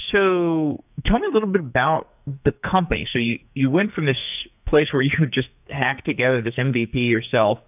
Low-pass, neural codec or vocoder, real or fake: 3.6 kHz; codec, 44.1 kHz, 2.6 kbps, SNAC; fake